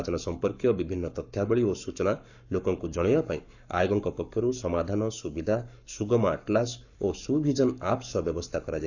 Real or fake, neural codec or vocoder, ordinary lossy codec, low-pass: fake; codec, 44.1 kHz, 7.8 kbps, Pupu-Codec; none; 7.2 kHz